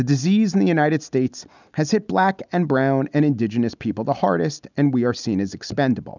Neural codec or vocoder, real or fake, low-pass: none; real; 7.2 kHz